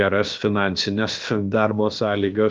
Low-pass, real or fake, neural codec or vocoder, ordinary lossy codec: 7.2 kHz; fake; codec, 16 kHz, about 1 kbps, DyCAST, with the encoder's durations; Opus, 24 kbps